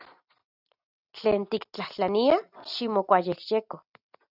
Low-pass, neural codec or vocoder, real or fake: 5.4 kHz; none; real